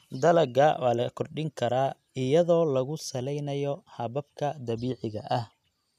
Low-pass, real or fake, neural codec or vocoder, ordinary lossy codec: 14.4 kHz; real; none; none